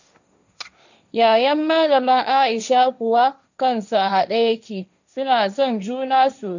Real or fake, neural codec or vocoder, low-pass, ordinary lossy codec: fake; codec, 16 kHz, 1.1 kbps, Voila-Tokenizer; 7.2 kHz; none